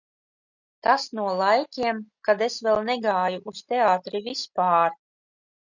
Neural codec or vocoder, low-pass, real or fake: none; 7.2 kHz; real